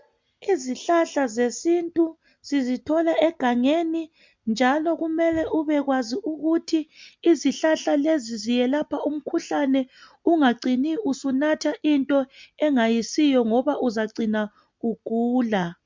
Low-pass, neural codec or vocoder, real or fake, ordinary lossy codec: 7.2 kHz; none; real; MP3, 64 kbps